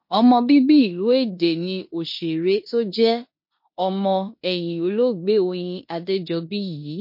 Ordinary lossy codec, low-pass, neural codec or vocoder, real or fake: MP3, 32 kbps; 5.4 kHz; codec, 16 kHz in and 24 kHz out, 0.9 kbps, LongCat-Audio-Codec, four codebook decoder; fake